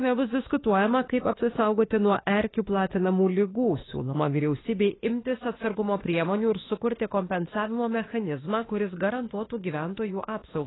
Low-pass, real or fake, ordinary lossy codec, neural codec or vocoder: 7.2 kHz; fake; AAC, 16 kbps; autoencoder, 48 kHz, 32 numbers a frame, DAC-VAE, trained on Japanese speech